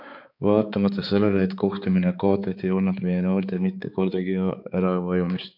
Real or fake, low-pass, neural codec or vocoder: fake; 5.4 kHz; codec, 16 kHz, 4 kbps, X-Codec, HuBERT features, trained on balanced general audio